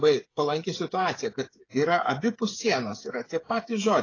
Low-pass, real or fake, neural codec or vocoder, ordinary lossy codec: 7.2 kHz; fake; codec, 16 kHz, 8 kbps, FreqCodec, smaller model; AAC, 32 kbps